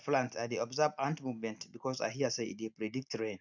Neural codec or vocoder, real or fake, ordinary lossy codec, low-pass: none; real; none; 7.2 kHz